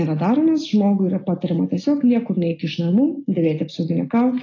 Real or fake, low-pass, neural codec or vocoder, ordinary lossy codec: real; 7.2 kHz; none; AAC, 32 kbps